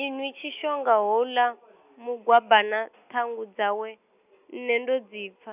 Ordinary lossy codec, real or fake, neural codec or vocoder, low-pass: none; real; none; 3.6 kHz